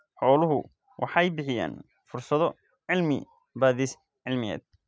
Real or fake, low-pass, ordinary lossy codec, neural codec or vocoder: real; none; none; none